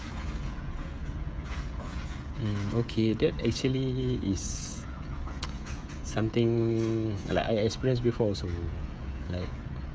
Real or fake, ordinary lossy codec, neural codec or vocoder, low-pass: fake; none; codec, 16 kHz, 16 kbps, FreqCodec, smaller model; none